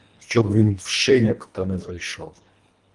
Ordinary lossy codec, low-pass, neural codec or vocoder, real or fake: Opus, 32 kbps; 10.8 kHz; codec, 24 kHz, 1.5 kbps, HILCodec; fake